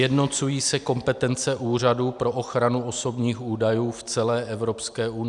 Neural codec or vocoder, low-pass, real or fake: none; 10.8 kHz; real